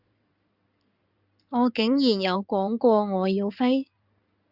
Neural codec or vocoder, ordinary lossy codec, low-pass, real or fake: codec, 16 kHz in and 24 kHz out, 2.2 kbps, FireRedTTS-2 codec; Opus, 64 kbps; 5.4 kHz; fake